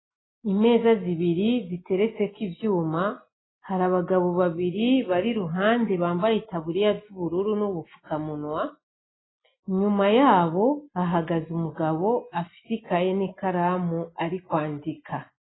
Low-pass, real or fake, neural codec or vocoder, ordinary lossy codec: 7.2 kHz; real; none; AAC, 16 kbps